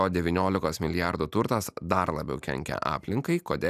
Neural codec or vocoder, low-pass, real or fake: none; 14.4 kHz; real